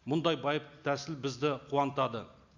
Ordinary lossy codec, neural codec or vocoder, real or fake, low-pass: none; none; real; 7.2 kHz